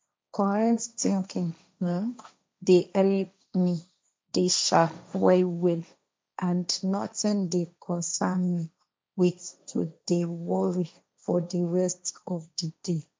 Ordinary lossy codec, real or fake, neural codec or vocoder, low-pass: none; fake; codec, 16 kHz, 1.1 kbps, Voila-Tokenizer; none